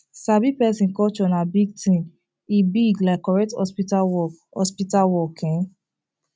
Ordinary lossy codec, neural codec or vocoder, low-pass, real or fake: none; none; none; real